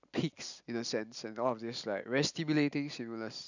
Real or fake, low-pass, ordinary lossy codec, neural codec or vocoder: real; 7.2 kHz; none; none